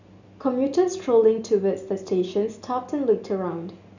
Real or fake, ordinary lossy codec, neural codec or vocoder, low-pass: real; none; none; 7.2 kHz